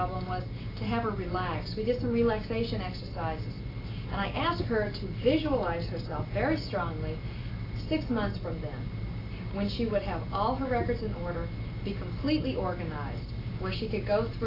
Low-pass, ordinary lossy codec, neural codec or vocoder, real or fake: 5.4 kHz; AAC, 24 kbps; none; real